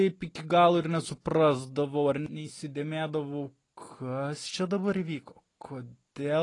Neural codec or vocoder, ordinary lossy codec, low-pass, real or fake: none; AAC, 32 kbps; 10.8 kHz; real